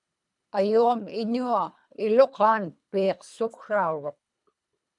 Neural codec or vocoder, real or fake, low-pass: codec, 24 kHz, 3 kbps, HILCodec; fake; 10.8 kHz